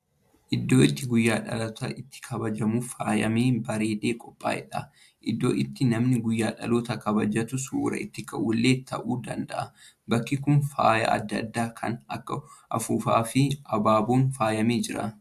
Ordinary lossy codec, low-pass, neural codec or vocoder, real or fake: AAC, 96 kbps; 14.4 kHz; none; real